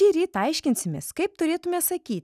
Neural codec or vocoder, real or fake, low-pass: none; real; 14.4 kHz